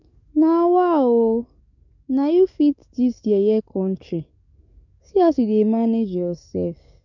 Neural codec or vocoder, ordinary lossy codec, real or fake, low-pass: codec, 44.1 kHz, 7.8 kbps, DAC; none; fake; 7.2 kHz